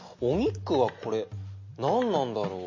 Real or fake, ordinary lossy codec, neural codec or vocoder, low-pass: real; MP3, 32 kbps; none; 7.2 kHz